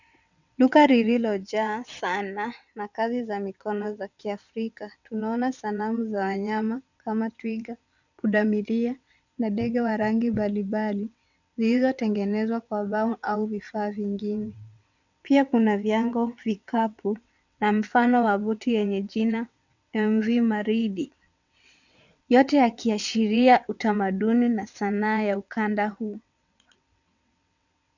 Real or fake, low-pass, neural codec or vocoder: fake; 7.2 kHz; vocoder, 22.05 kHz, 80 mel bands, WaveNeXt